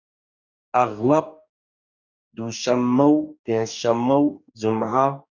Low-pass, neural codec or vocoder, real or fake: 7.2 kHz; codec, 44.1 kHz, 2.6 kbps, DAC; fake